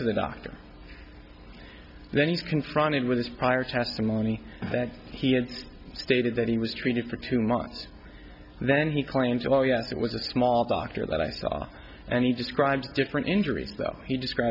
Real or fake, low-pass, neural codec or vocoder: real; 5.4 kHz; none